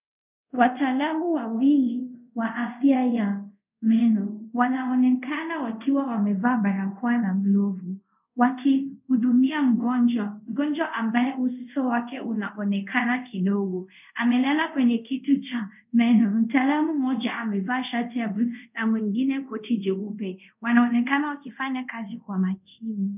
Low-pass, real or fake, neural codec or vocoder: 3.6 kHz; fake; codec, 24 kHz, 0.5 kbps, DualCodec